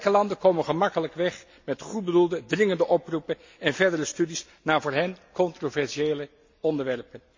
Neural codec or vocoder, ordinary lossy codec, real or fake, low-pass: none; MP3, 48 kbps; real; 7.2 kHz